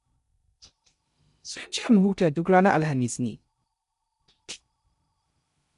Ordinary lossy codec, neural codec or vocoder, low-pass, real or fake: none; codec, 16 kHz in and 24 kHz out, 0.6 kbps, FocalCodec, streaming, 2048 codes; 10.8 kHz; fake